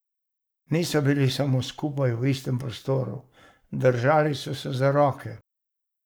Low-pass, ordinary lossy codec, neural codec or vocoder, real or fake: none; none; codec, 44.1 kHz, 7.8 kbps, Pupu-Codec; fake